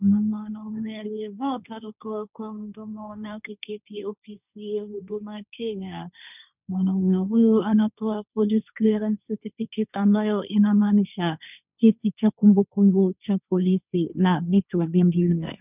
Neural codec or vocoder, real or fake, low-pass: codec, 16 kHz, 1.1 kbps, Voila-Tokenizer; fake; 3.6 kHz